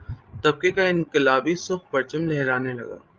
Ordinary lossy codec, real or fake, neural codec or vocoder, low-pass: Opus, 24 kbps; fake; codec, 16 kHz, 16 kbps, FreqCodec, larger model; 7.2 kHz